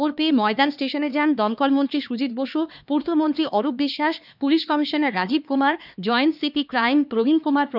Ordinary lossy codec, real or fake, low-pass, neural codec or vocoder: none; fake; 5.4 kHz; codec, 16 kHz, 2 kbps, X-Codec, HuBERT features, trained on LibriSpeech